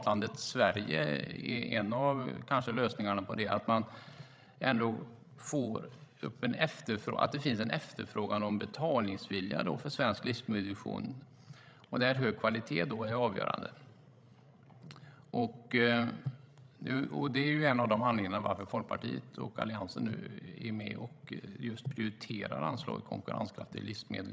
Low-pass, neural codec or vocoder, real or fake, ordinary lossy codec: none; codec, 16 kHz, 16 kbps, FreqCodec, larger model; fake; none